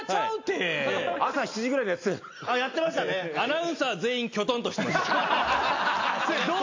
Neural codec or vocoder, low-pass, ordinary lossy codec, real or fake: none; 7.2 kHz; AAC, 48 kbps; real